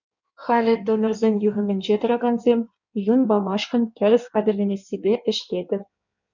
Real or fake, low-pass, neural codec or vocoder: fake; 7.2 kHz; codec, 16 kHz in and 24 kHz out, 1.1 kbps, FireRedTTS-2 codec